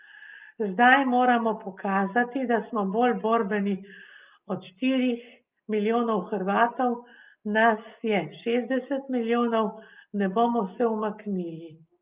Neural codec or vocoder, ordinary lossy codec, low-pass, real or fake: none; Opus, 24 kbps; 3.6 kHz; real